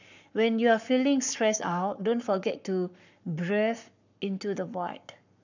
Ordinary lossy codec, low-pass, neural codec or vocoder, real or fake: none; 7.2 kHz; codec, 44.1 kHz, 7.8 kbps, Pupu-Codec; fake